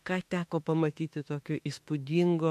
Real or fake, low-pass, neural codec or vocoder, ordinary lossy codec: fake; 14.4 kHz; autoencoder, 48 kHz, 32 numbers a frame, DAC-VAE, trained on Japanese speech; MP3, 64 kbps